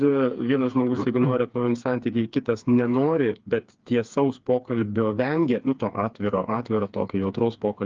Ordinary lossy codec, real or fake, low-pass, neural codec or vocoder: Opus, 24 kbps; fake; 7.2 kHz; codec, 16 kHz, 4 kbps, FreqCodec, smaller model